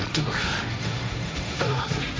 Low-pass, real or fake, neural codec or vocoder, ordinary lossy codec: none; fake; codec, 16 kHz, 1.1 kbps, Voila-Tokenizer; none